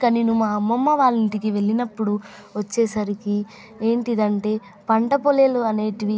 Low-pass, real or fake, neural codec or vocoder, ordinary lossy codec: none; real; none; none